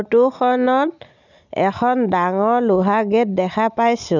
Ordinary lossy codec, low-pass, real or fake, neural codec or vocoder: none; 7.2 kHz; real; none